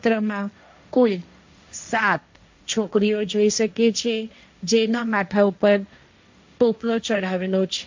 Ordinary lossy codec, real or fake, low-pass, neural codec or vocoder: MP3, 64 kbps; fake; 7.2 kHz; codec, 16 kHz, 1.1 kbps, Voila-Tokenizer